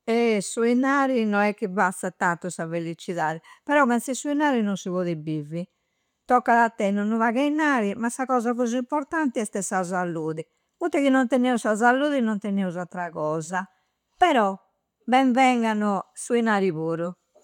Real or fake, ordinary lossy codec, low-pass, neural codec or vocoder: real; none; 19.8 kHz; none